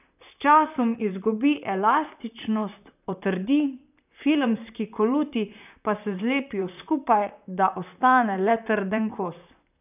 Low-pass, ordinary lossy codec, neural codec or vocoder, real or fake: 3.6 kHz; none; vocoder, 44.1 kHz, 128 mel bands, Pupu-Vocoder; fake